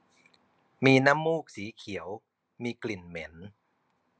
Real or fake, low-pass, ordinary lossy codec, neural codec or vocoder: real; none; none; none